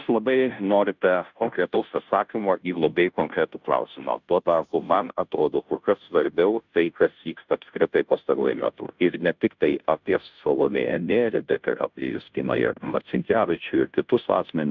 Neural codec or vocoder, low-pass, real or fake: codec, 16 kHz, 0.5 kbps, FunCodec, trained on Chinese and English, 25 frames a second; 7.2 kHz; fake